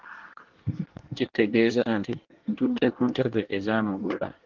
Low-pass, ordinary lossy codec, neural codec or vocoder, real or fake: 7.2 kHz; Opus, 16 kbps; codec, 24 kHz, 1 kbps, SNAC; fake